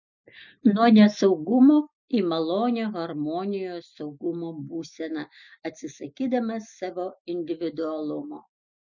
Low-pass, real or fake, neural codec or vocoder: 7.2 kHz; real; none